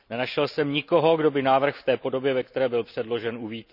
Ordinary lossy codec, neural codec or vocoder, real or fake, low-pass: none; none; real; 5.4 kHz